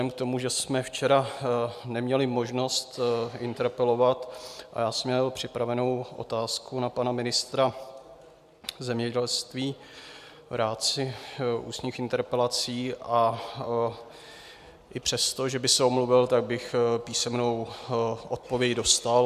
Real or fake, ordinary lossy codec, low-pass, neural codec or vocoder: real; MP3, 96 kbps; 14.4 kHz; none